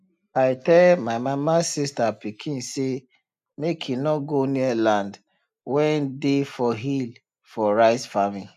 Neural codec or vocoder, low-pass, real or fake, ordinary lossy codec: none; 14.4 kHz; real; none